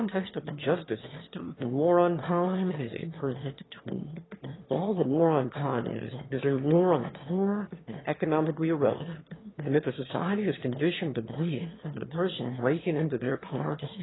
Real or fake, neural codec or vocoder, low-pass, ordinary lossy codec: fake; autoencoder, 22.05 kHz, a latent of 192 numbers a frame, VITS, trained on one speaker; 7.2 kHz; AAC, 16 kbps